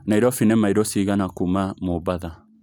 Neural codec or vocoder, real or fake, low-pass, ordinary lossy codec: none; real; none; none